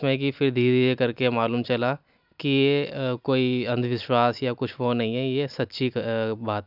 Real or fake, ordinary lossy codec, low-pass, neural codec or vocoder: real; none; 5.4 kHz; none